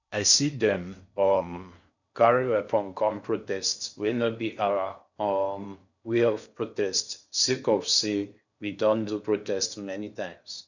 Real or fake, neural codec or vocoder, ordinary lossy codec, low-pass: fake; codec, 16 kHz in and 24 kHz out, 0.6 kbps, FocalCodec, streaming, 4096 codes; none; 7.2 kHz